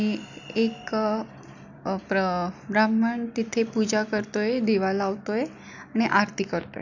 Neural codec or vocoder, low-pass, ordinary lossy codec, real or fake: none; 7.2 kHz; none; real